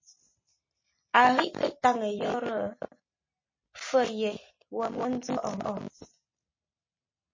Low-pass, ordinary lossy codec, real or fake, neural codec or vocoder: 7.2 kHz; MP3, 32 kbps; real; none